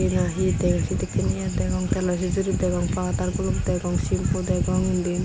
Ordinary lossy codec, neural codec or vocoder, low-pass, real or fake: none; none; none; real